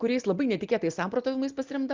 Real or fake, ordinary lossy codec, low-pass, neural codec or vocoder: real; Opus, 24 kbps; 7.2 kHz; none